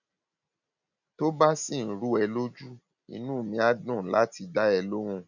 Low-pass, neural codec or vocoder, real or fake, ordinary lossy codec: 7.2 kHz; none; real; none